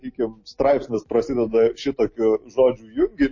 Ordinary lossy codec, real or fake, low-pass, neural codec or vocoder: MP3, 32 kbps; real; 7.2 kHz; none